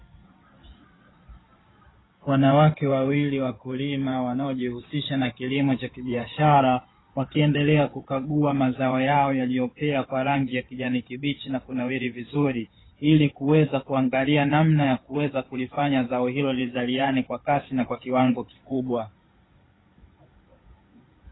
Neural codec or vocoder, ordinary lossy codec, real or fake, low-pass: codec, 16 kHz in and 24 kHz out, 2.2 kbps, FireRedTTS-2 codec; AAC, 16 kbps; fake; 7.2 kHz